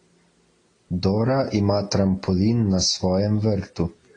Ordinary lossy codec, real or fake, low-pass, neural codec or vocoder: AAC, 32 kbps; real; 9.9 kHz; none